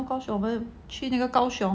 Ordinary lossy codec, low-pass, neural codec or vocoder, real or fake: none; none; none; real